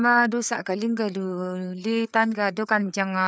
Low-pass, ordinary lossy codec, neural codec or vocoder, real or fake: none; none; codec, 16 kHz, 4 kbps, FreqCodec, larger model; fake